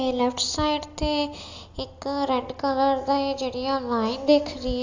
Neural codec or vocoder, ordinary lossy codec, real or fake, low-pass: none; AAC, 48 kbps; real; 7.2 kHz